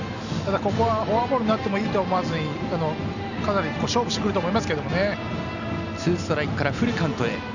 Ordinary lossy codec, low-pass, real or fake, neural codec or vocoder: none; 7.2 kHz; real; none